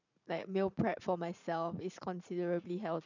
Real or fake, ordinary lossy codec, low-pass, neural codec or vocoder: fake; none; 7.2 kHz; vocoder, 44.1 kHz, 80 mel bands, Vocos